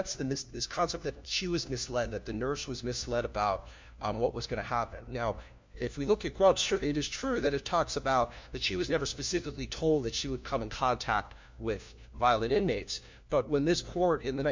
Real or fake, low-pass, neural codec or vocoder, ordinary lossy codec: fake; 7.2 kHz; codec, 16 kHz, 1 kbps, FunCodec, trained on LibriTTS, 50 frames a second; MP3, 64 kbps